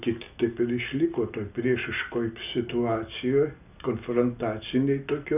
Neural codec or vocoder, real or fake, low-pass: none; real; 3.6 kHz